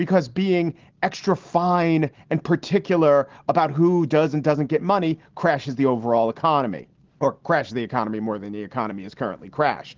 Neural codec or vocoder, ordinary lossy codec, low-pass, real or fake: none; Opus, 16 kbps; 7.2 kHz; real